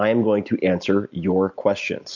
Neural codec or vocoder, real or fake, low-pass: none; real; 7.2 kHz